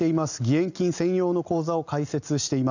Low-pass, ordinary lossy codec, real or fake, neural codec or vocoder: 7.2 kHz; none; real; none